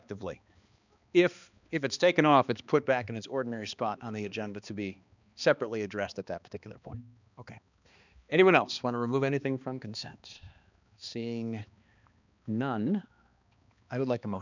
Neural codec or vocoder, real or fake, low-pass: codec, 16 kHz, 2 kbps, X-Codec, HuBERT features, trained on balanced general audio; fake; 7.2 kHz